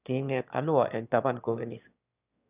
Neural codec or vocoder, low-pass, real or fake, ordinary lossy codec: autoencoder, 22.05 kHz, a latent of 192 numbers a frame, VITS, trained on one speaker; 3.6 kHz; fake; none